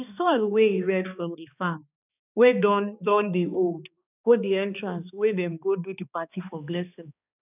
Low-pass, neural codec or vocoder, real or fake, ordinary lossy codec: 3.6 kHz; codec, 16 kHz, 2 kbps, X-Codec, HuBERT features, trained on balanced general audio; fake; none